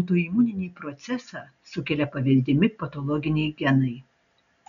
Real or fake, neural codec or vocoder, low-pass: real; none; 7.2 kHz